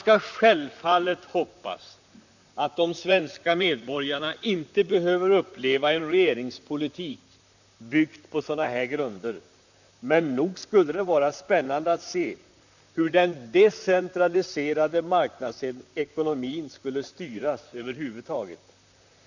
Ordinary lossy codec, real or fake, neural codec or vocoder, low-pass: none; fake; vocoder, 44.1 kHz, 128 mel bands, Pupu-Vocoder; 7.2 kHz